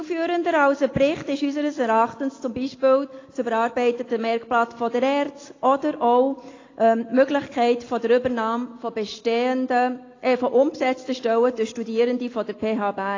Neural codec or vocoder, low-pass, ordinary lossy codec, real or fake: none; 7.2 kHz; AAC, 32 kbps; real